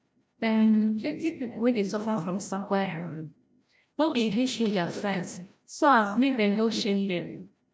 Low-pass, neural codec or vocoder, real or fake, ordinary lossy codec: none; codec, 16 kHz, 0.5 kbps, FreqCodec, larger model; fake; none